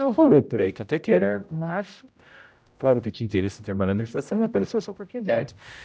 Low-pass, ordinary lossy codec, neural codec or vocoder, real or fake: none; none; codec, 16 kHz, 0.5 kbps, X-Codec, HuBERT features, trained on general audio; fake